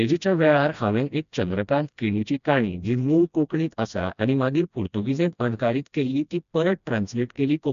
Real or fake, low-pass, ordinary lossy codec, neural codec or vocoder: fake; 7.2 kHz; none; codec, 16 kHz, 1 kbps, FreqCodec, smaller model